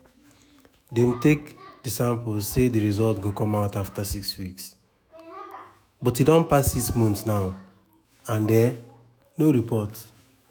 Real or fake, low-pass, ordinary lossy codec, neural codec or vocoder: fake; none; none; autoencoder, 48 kHz, 128 numbers a frame, DAC-VAE, trained on Japanese speech